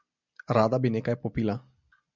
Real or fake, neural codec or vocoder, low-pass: real; none; 7.2 kHz